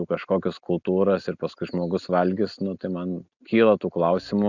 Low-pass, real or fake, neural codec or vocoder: 7.2 kHz; real; none